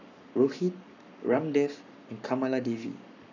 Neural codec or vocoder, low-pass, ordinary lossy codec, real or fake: codec, 44.1 kHz, 7.8 kbps, Pupu-Codec; 7.2 kHz; none; fake